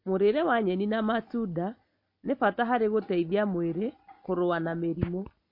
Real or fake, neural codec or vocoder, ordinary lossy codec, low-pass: real; none; MP3, 32 kbps; 5.4 kHz